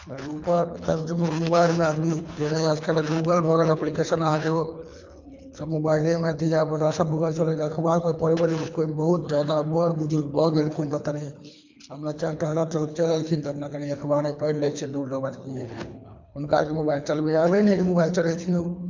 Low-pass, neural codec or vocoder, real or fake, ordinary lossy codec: 7.2 kHz; codec, 24 kHz, 3 kbps, HILCodec; fake; MP3, 64 kbps